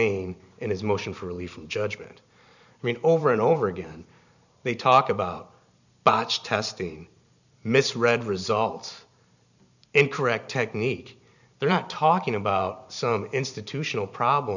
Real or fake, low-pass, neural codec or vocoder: fake; 7.2 kHz; vocoder, 44.1 kHz, 128 mel bands every 512 samples, BigVGAN v2